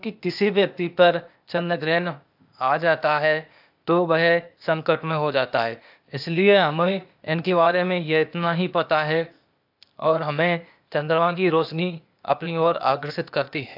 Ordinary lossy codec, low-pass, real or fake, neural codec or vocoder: AAC, 48 kbps; 5.4 kHz; fake; codec, 16 kHz, 0.8 kbps, ZipCodec